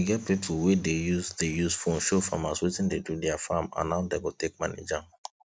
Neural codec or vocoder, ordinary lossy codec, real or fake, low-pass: none; none; real; none